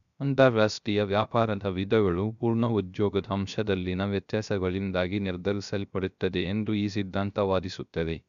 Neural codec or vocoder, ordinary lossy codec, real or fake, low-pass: codec, 16 kHz, 0.3 kbps, FocalCodec; none; fake; 7.2 kHz